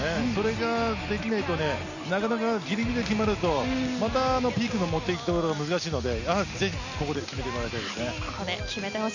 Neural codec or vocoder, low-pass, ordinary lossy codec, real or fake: none; 7.2 kHz; none; real